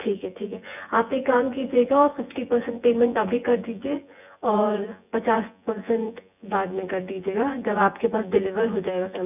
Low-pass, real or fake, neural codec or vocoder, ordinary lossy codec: 3.6 kHz; fake; vocoder, 24 kHz, 100 mel bands, Vocos; none